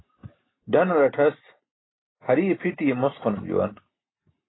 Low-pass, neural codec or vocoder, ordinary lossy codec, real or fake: 7.2 kHz; none; AAC, 16 kbps; real